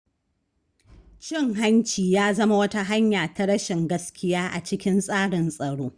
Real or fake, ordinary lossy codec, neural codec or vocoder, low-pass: real; Opus, 64 kbps; none; 9.9 kHz